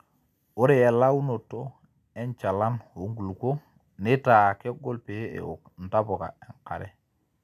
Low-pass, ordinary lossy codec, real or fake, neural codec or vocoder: 14.4 kHz; none; real; none